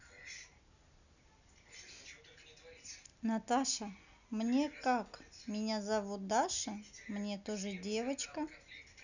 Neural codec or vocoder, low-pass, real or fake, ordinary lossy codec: none; 7.2 kHz; real; none